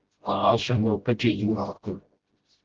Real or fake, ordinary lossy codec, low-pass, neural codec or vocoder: fake; Opus, 16 kbps; 7.2 kHz; codec, 16 kHz, 0.5 kbps, FreqCodec, smaller model